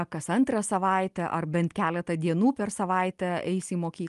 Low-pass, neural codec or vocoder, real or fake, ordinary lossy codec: 10.8 kHz; none; real; Opus, 32 kbps